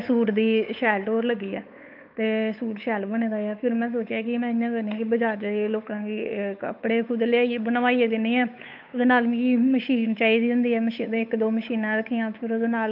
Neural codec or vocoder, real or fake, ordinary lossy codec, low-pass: codec, 16 kHz, 8 kbps, FunCodec, trained on Chinese and English, 25 frames a second; fake; none; 5.4 kHz